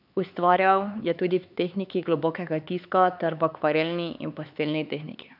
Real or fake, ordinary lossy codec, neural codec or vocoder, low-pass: fake; none; codec, 16 kHz, 4 kbps, X-Codec, HuBERT features, trained on LibriSpeech; 5.4 kHz